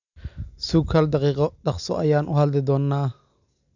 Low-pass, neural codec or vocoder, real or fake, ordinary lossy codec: 7.2 kHz; none; real; none